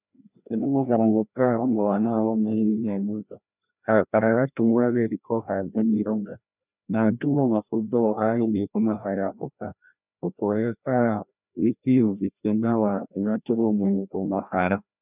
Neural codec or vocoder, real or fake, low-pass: codec, 16 kHz, 1 kbps, FreqCodec, larger model; fake; 3.6 kHz